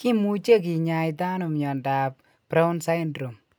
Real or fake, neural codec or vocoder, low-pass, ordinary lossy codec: real; none; none; none